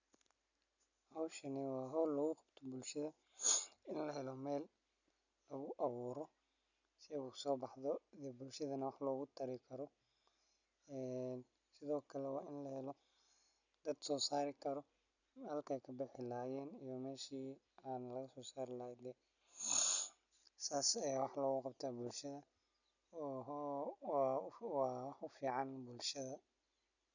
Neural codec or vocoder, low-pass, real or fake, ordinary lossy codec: none; 7.2 kHz; real; none